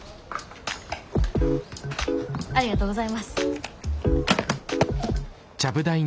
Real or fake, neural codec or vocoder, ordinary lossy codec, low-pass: real; none; none; none